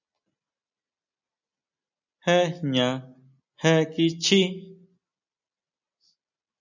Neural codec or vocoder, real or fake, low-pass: none; real; 7.2 kHz